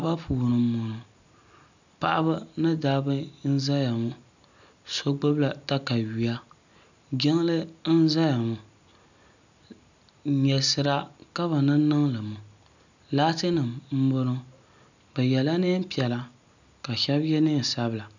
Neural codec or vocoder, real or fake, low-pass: none; real; 7.2 kHz